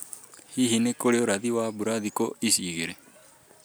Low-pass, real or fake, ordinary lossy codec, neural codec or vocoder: none; real; none; none